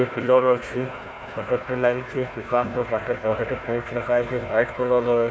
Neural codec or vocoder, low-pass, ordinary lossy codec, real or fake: codec, 16 kHz, 1 kbps, FunCodec, trained on Chinese and English, 50 frames a second; none; none; fake